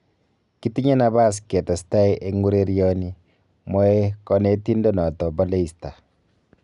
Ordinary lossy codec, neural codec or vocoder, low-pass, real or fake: none; none; 10.8 kHz; real